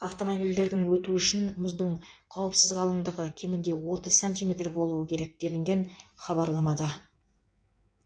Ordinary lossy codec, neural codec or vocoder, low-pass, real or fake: none; codec, 16 kHz in and 24 kHz out, 1.1 kbps, FireRedTTS-2 codec; 9.9 kHz; fake